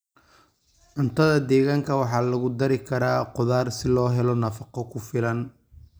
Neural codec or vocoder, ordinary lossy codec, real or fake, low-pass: none; none; real; none